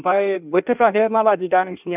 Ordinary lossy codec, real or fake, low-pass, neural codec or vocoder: none; fake; 3.6 kHz; codec, 16 kHz in and 24 kHz out, 1.1 kbps, FireRedTTS-2 codec